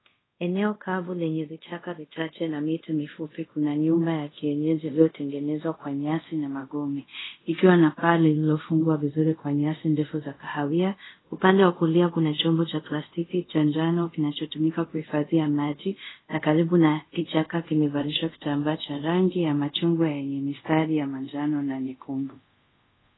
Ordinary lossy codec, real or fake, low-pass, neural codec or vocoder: AAC, 16 kbps; fake; 7.2 kHz; codec, 24 kHz, 0.5 kbps, DualCodec